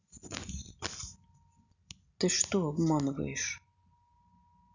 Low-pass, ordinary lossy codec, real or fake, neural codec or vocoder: 7.2 kHz; none; real; none